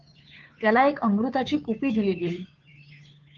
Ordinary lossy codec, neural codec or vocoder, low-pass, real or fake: Opus, 24 kbps; codec, 16 kHz, 2 kbps, FunCodec, trained on Chinese and English, 25 frames a second; 7.2 kHz; fake